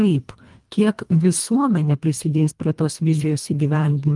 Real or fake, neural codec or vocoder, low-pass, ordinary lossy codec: fake; codec, 24 kHz, 1.5 kbps, HILCodec; 10.8 kHz; Opus, 32 kbps